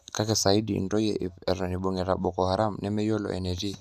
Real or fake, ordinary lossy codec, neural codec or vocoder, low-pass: real; none; none; 14.4 kHz